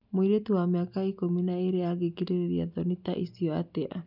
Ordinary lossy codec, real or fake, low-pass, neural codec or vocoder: none; real; 5.4 kHz; none